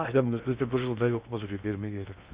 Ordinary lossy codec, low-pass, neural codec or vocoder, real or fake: Opus, 64 kbps; 3.6 kHz; codec, 16 kHz in and 24 kHz out, 0.6 kbps, FocalCodec, streaming, 2048 codes; fake